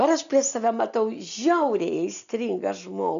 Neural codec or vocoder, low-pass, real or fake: none; 7.2 kHz; real